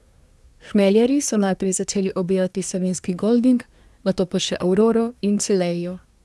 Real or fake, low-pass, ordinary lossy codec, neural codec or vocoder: fake; none; none; codec, 24 kHz, 1 kbps, SNAC